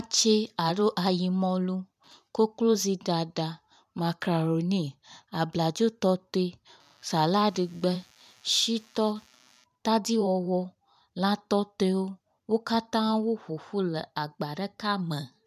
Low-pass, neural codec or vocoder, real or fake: 14.4 kHz; vocoder, 44.1 kHz, 128 mel bands every 512 samples, BigVGAN v2; fake